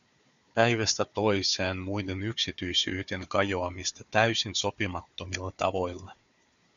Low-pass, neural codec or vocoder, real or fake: 7.2 kHz; codec, 16 kHz, 4 kbps, FunCodec, trained on Chinese and English, 50 frames a second; fake